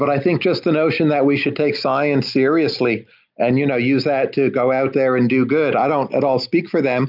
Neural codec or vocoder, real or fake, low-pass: none; real; 5.4 kHz